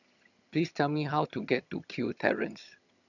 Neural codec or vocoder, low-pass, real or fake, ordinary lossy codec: vocoder, 22.05 kHz, 80 mel bands, HiFi-GAN; 7.2 kHz; fake; none